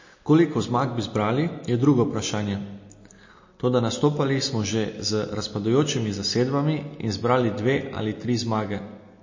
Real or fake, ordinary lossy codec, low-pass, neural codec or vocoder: real; MP3, 32 kbps; 7.2 kHz; none